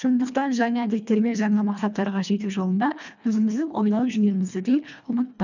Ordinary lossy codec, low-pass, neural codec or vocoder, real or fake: none; 7.2 kHz; codec, 24 kHz, 1.5 kbps, HILCodec; fake